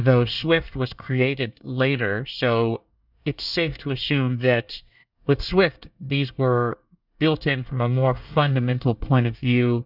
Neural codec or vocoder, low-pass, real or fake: codec, 24 kHz, 1 kbps, SNAC; 5.4 kHz; fake